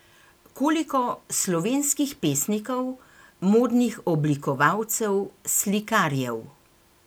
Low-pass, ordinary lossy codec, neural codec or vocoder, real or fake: none; none; none; real